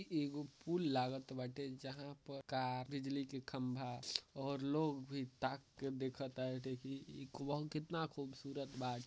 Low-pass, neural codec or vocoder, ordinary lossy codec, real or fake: none; none; none; real